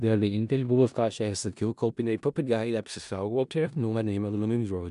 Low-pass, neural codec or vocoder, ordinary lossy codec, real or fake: 10.8 kHz; codec, 16 kHz in and 24 kHz out, 0.4 kbps, LongCat-Audio-Codec, four codebook decoder; MP3, 96 kbps; fake